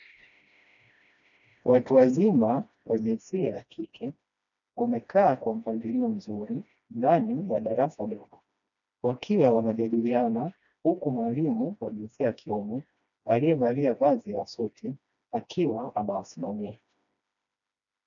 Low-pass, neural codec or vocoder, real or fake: 7.2 kHz; codec, 16 kHz, 1 kbps, FreqCodec, smaller model; fake